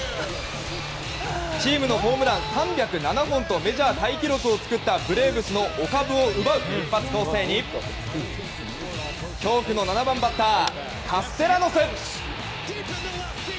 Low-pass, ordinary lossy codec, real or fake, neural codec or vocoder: none; none; real; none